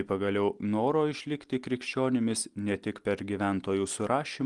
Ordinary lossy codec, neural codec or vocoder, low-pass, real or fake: Opus, 24 kbps; none; 10.8 kHz; real